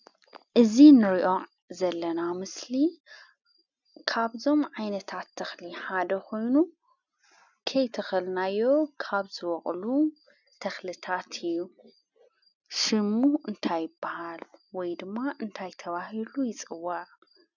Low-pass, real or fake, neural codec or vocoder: 7.2 kHz; real; none